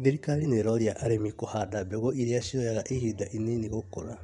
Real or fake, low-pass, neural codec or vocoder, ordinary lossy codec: fake; 10.8 kHz; vocoder, 24 kHz, 100 mel bands, Vocos; none